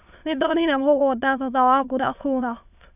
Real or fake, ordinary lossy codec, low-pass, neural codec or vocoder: fake; none; 3.6 kHz; autoencoder, 22.05 kHz, a latent of 192 numbers a frame, VITS, trained on many speakers